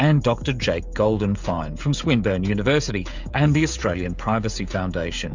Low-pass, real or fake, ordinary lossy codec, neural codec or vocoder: 7.2 kHz; fake; MP3, 64 kbps; codec, 16 kHz, 16 kbps, FreqCodec, smaller model